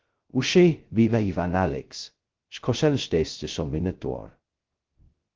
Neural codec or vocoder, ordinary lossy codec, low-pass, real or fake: codec, 16 kHz, 0.2 kbps, FocalCodec; Opus, 16 kbps; 7.2 kHz; fake